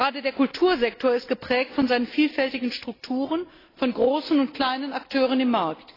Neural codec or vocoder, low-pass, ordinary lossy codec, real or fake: none; 5.4 kHz; AAC, 24 kbps; real